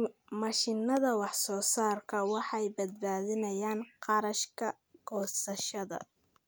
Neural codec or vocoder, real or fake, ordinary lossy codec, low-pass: none; real; none; none